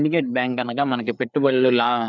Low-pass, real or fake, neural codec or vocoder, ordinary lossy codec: 7.2 kHz; fake; codec, 16 kHz, 4 kbps, FreqCodec, larger model; none